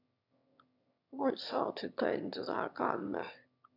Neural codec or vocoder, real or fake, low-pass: autoencoder, 22.05 kHz, a latent of 192 numbers a frame, VITS, trained on one speaker; fake; 5.4 kHz